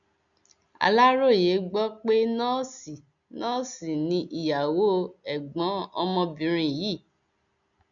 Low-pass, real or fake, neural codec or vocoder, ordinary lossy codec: 7.2 kHz; real; none; none